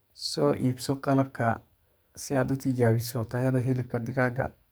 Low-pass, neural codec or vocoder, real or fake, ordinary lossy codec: none; codec, 44.1 kHz, 2.6 kbps, SNAC; fake; none